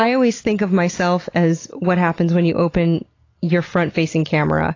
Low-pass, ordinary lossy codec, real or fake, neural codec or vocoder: 7.2 kHz; AAC, 32 kbps; real; none